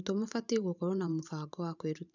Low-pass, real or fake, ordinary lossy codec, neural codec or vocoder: 7.2 kHz; fake; none; vocoder, 44.1 kHz, 128 mel bands every 512 samples, BigVGAN v2